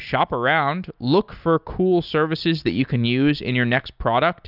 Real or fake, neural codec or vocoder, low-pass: real; none; 5.4 kHz